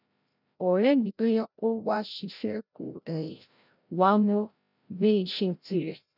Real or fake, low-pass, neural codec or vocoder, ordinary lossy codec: fake; 5.4 kHz; codec, 16 kHz, 0.5 kbps, FreqCodec, larger model; none